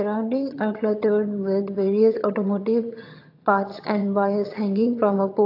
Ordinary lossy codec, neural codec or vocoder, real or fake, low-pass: AAC, 32 kbps; vocoder, 22.05 kHz, 80 mel bands, HiFi-GAN; fake; 5.4 kHz